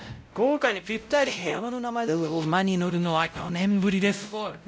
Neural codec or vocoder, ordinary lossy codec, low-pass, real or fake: codec, 16 kHz, 0.5 kbps, X-Codec, WavLM features, trained on Multilingual LibriSpeech; none; none; fake